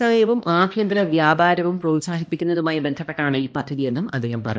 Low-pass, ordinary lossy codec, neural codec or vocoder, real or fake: none; none; codec, 16 kHz, 1 kbps, X-Codec, HuBERT features, trained on balanced general audio; fake